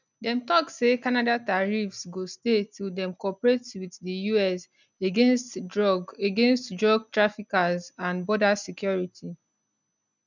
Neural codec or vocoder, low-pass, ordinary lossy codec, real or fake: none; 7.2 kHz; none; real